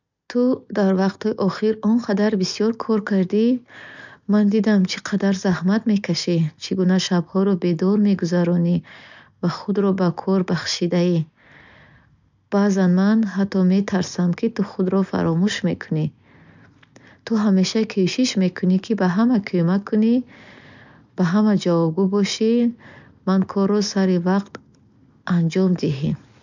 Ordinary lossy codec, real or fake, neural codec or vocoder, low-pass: none; real; none; 7.2 kHz